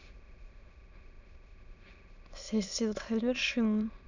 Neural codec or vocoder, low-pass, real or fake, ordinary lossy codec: autoencoder, 22.05 kHz, a latent of 192 numbers a frame, VITS, trained on many speakers; 7.2 kHz; fake; none